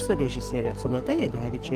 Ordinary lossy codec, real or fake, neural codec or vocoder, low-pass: Opus, 24 kbps; fake; codec, 44.1 kHz, 2.6 kbps, SNAC; 14.4 kHz